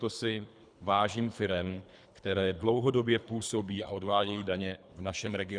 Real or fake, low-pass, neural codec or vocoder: fake; 9.9 kHz; codec, 24 kHz, 3 kbps, HILCodec